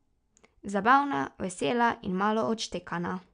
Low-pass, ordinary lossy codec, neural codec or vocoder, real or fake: 9.9 kHz; none; none; real